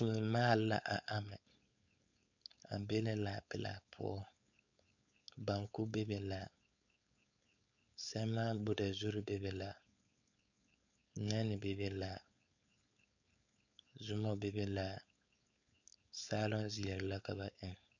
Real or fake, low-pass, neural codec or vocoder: fake; 7.2 kHz; codec, 16 kHz, 4.8 kbps, FACodec